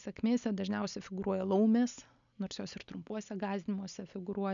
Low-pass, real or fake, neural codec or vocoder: 7.2 kHz; real; none